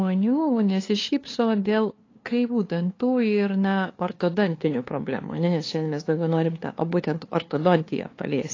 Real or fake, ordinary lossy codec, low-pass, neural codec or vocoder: fake; AAC, 32 kbps; 7.2 kHz; codec, 16 kHz, 2 kbps, FunCodec, trained on LibriTTS, 25 frames a second